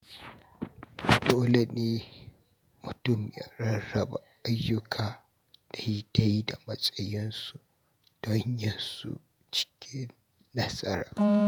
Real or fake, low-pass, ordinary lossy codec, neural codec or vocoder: fake; none; none; vocoder, 48 kHz, 128 mel bands, Vocos